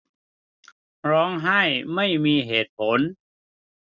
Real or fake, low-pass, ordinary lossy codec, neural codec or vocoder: real; 7.2 kHz; none; none